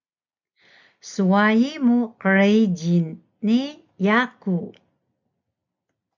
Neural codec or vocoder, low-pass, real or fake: none; 7.2 kHz; real